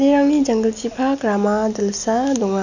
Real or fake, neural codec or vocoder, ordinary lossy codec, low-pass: real; none; none; 7.2 kHz